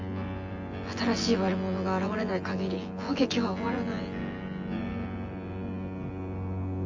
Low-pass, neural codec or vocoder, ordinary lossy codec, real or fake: 7.2 kHz; vocoder, 24 kHz, 100 mel bands, Vocos; Opus, 32 kbps; fake